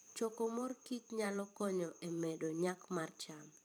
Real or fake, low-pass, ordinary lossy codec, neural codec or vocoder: fake; none; none; vocoder, 44.1 kHz, 128 mel bands every 512 samples, BigVGAN v2